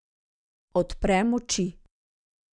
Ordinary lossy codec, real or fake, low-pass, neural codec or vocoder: none; real; 9.9 kHz; none